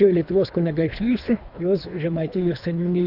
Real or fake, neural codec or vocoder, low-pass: fake; codec, 24 kHz, 3 kbps, HILCodec; 5.4 kHz